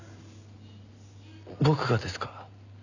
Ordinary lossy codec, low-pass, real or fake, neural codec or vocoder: none; 7.2 kHz; real; none